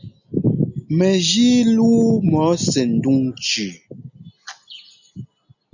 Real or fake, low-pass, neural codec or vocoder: real; 7.2 kHz; none